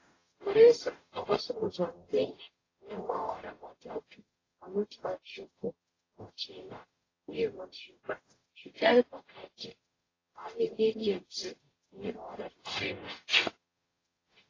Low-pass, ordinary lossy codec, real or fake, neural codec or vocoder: 7.2 kHz; AAC, 32 kbps; fake; codec, 44.1 kHz, 0.9 kbps, DAC